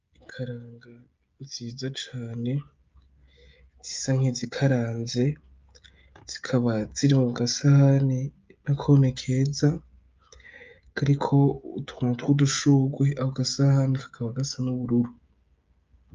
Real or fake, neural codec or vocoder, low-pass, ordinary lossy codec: fake; codec, 16 kHz, 16 kbps, FreqCodec, smaller model; 7.2 kHz; Opus, 24 kbps